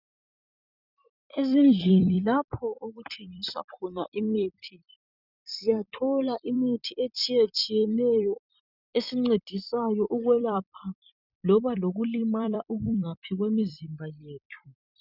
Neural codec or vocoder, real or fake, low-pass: vocoder, 44.1 kHz, 128 mel bands every 256 samples, BigVGAN v2; fake; 5.4 kHz